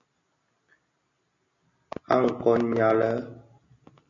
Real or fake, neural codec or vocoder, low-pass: real; none; 7.2 kHz